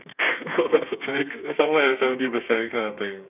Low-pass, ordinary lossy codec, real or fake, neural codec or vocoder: 3.6 kHz; none; fake; codec, 32 kHz, 1.9 kbps, SNAC